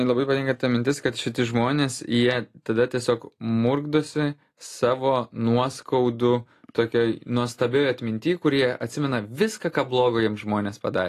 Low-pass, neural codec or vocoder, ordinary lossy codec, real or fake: 14.4 kHz; none; AAC, 48 kbps; real